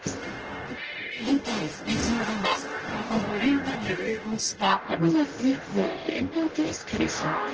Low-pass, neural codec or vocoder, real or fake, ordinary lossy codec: 7.2 kHz; codec, 44.1 kHz, 0.9 kbps, DAC; fake; Opus, 16 kbps